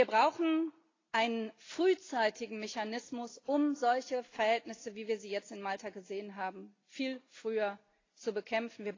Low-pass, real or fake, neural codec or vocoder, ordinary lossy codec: 7.2 kHz; real; none; AAC, 32 kbps